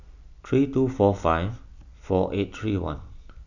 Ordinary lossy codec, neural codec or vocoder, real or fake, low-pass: none; none; real; 7.2 kHz